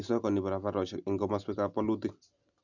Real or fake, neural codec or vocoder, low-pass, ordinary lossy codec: real; none; 7.2 kHz; none